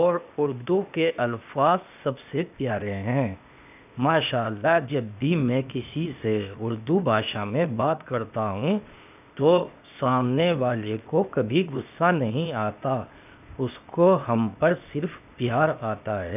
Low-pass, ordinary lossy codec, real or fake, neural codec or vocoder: 3.6 kHz; none; fake; codec, 16 kHz, 0.8 kbps, ZipCodec